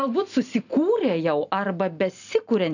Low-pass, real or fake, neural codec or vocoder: 7.2 kHz; real; none